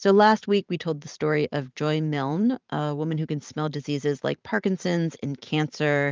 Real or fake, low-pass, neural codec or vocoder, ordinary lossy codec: fake; 7.2 kHz; vocoder, 44.1 kHz, 128 mel bands every 512 samples, BigVGAN v2; Opus, 24 kbps